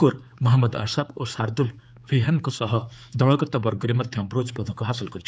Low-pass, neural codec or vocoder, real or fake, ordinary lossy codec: none; codec, 16 kHz, 4 kbps, X-Codec, HuBERT features, trained on general audio; fake; none